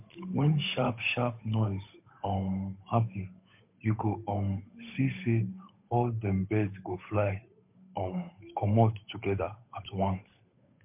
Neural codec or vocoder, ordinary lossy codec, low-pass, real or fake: codec, 16 kHz, 8 kbps, FunCodec, trained on Chinese and English, 25 frames a second; MP3, 32 kbps; 3.6 kHz; fake